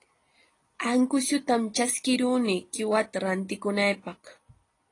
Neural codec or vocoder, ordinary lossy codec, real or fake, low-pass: none; AAC, 32 kbps; real; 10.8 kHz